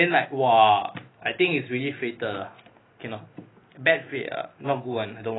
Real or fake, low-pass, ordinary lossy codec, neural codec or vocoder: real; 7.2 kHz; AAC, 16 kbps; none